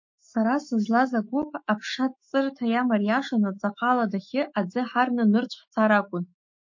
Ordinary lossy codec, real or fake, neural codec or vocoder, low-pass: MP3, 32 kbps; fake; autoencoder, 48 kHz, 128 numbers a frame, DAC-VAE, trained on Japanese speech; 7.2 kHz